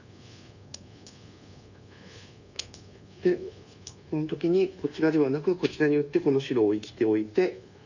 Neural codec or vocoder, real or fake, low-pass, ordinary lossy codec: codec, 24 kHz, 1.2 kbps, DualCodec; fake; 7.2 kHz; none